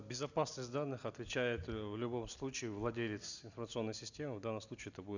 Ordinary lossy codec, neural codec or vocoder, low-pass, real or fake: none; none; 7.2 kHz; real